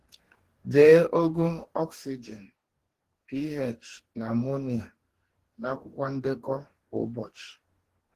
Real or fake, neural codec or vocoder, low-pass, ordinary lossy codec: fake; codec, 44.1 kHz, 2.6 kbps, DAC; 14.4 kHz; Opus, 16 kbps